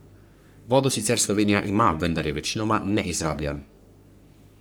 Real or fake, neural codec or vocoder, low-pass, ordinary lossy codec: fake; codec, 44.1 kHz, 3.4 kbps, Pupu-Codec; none; none